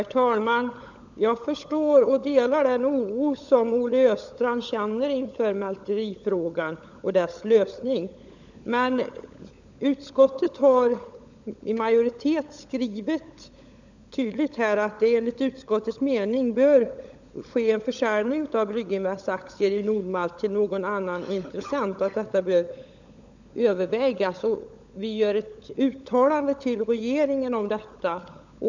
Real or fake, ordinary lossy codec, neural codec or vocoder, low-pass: fake; none; codec, 16 kHz, 16 kbps, FunCodec, trained on LibriTTS, 50 frames a second; 7.2 kHz